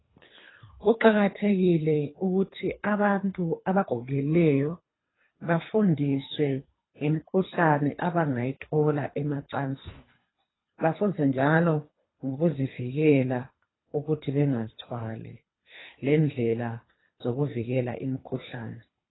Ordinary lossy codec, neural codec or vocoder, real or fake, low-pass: AAC, 16 kbps; codec, 24 kHz, 3 kbps, HILCodec; fake; 7.2 kHz